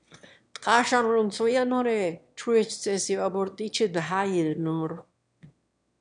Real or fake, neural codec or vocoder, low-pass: fake; autoencoder, 22.05 kHz, a latent of 192 numbers a frame, VITS, trained on one speaker; 9.9 kHz